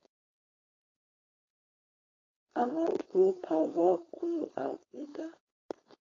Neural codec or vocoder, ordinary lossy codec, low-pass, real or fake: codec, 16 kHz, 4.8 kbps, FACodec; AAC, 32 kbps; 7.2 kHz; fake